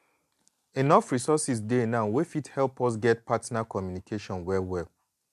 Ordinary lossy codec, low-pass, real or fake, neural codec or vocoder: MP3, 96 kbps; 14.4 kHz; real; none